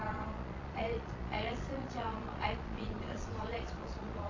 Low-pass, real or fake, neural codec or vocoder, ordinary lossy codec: 7.2 kHz; fake; vocoder, 22.05 kHz, 80 mel bands, Vocos; AAC, 32 kbps